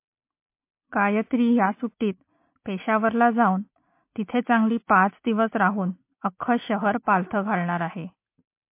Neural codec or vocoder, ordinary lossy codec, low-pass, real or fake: none; MP3, 24 kbps; 3.6 kHz; real